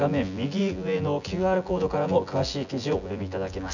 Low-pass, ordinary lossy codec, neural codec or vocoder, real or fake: 7.2 kHz; none; vocoder, 24 kHz, 100 mel bands, Vocos; fake